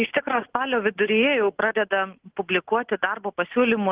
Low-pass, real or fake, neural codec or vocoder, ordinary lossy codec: 3.6 kHz; real; none; Opus, 16 kbps